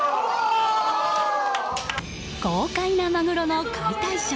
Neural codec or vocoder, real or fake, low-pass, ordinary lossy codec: none; real; none; none